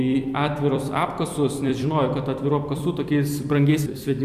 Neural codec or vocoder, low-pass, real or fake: vocoder, 44.1 kHz, 128 mel bands every 256 samples, BigVGAN v2; 14.4 kHz; fake